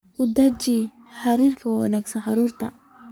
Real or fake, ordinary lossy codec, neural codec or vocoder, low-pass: fake; none; codec, 44.1 kHz, 3.4 kbps, Pupu-Codec; none